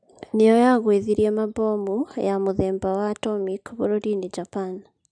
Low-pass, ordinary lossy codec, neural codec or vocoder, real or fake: 9.9 kHz; none; none; real